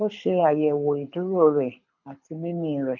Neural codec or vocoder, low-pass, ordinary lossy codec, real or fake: codec, 24 kHz, 6 kbps, HILCodec; 7.2 kHz; none; fake